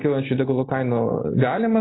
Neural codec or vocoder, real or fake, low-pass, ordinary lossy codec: none; real; 7.2 kHz; AAC, 16 kbps